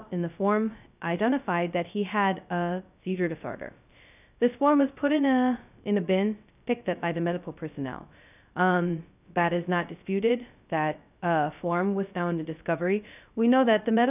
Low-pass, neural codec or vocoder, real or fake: 3.6 kHz; codec, 16 kHz, 0.2 kbps, FocalCodec; fake